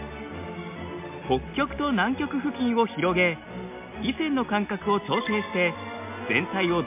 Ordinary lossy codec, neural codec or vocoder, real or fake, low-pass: MP3, 32 kbps; none; real; 3.6 kHz